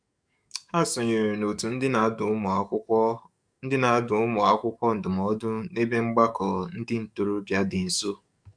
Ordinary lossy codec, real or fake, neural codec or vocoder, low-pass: none; fake; codec, 44.1 kHz, 7.8 kbps, DAC; 9.9 kHz